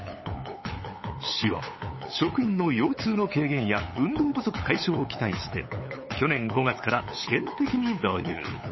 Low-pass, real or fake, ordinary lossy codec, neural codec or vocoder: 7.2 kHz; fake; MP3, 24 kbps; codec, 16 kHz, 8 kbps, FunCodec, trained on LibriTTS, 25 frames a second